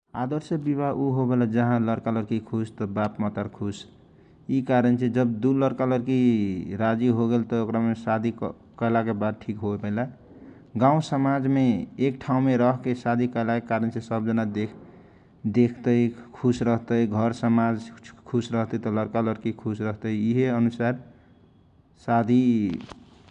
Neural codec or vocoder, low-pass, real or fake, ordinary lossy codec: none; 9.9 kHz; real; none